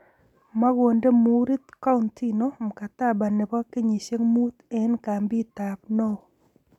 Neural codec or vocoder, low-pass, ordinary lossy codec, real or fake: none; 19.8 kHz; none; real